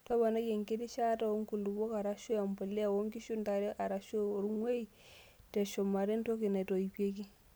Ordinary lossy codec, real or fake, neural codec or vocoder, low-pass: none; real; none; none